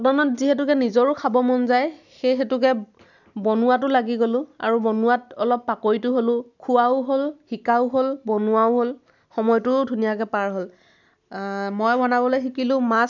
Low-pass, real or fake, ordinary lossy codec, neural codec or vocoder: 7.2 kHz; real; none; none